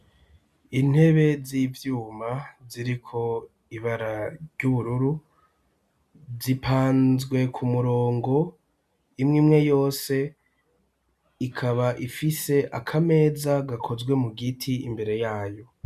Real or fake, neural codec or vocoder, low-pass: real; none; 14.4 kHz